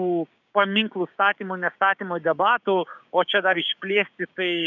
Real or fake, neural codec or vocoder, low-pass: fake; codec, 16 kHz, 6 kbps, DAC; 7.2 kHz